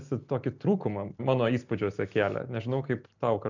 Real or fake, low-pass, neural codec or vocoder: real; 7.2 kHz; none